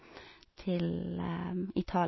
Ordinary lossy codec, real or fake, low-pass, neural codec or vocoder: MP3, 24 kbps; real; 7.2 kHz; none